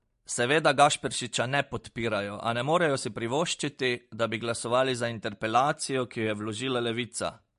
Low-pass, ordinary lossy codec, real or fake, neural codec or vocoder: 10.8 kHz; MP3, 48 kbps; real; none